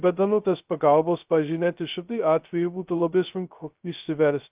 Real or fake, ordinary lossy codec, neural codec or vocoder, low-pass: fake; Opus, 32 kbps; codec, 16 kHz, 0.2 kbps, FocalCodec; 3.6 kHz